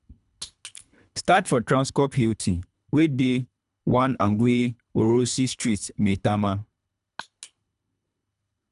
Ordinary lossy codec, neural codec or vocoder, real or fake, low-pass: none; codec, 24 kHz, 3 kbps, HILCodec; fake; 10.8 kHz